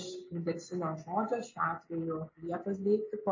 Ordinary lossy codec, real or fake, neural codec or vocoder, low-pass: MP3, 32 kbps; real; none; 7.2 kHz